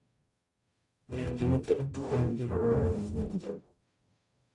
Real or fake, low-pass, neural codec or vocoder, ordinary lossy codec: fake; 10.8 kHz; codec, 44.1 kHz, 0.9 kbps, DAC; AAC, 48 kbps